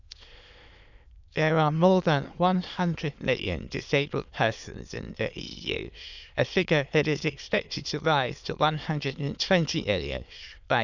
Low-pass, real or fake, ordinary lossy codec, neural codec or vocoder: 7.2 kHz; fake; none; autoencoder, 22.05 kHz, a latent of 192 numbers a frame, VITS, trained on many speakers